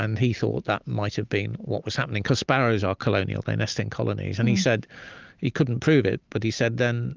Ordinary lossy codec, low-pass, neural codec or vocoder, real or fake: Opus, 24 kbps; 7.2 kHz; none; real